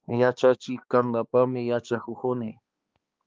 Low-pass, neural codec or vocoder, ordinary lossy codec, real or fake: 7.2 kHz; codec, 16 kHz, 2 kbps, X-Codec, HuBERT features, trained on balanced general audio; Opus, 24 kbps; fake